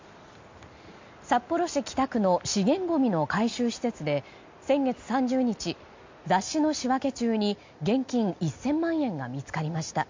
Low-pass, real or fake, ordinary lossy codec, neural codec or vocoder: 7.2 kHz; real; MP3, 48 kbps; none